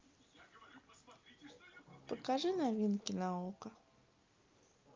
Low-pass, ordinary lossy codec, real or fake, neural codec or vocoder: 7.2 kHz; Opus, 24 kbps; real; none